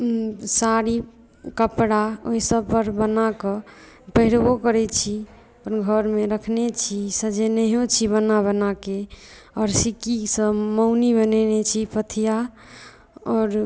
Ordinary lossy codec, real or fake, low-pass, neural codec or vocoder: none; real; none; none